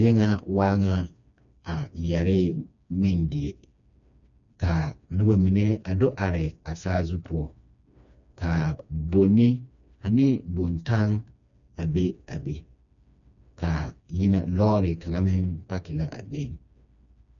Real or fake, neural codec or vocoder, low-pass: fake; codec, 16 kHz, 2 kbps, FreqCodec, smaller model; 7.2 kHz